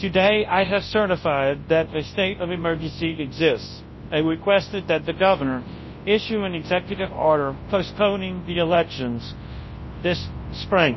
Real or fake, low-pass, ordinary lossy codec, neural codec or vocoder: fake; 7.2 kHz; MP3, 24 kbps; codec, 24 kHz, 0.9 kbps, WavTokenizer, large speech release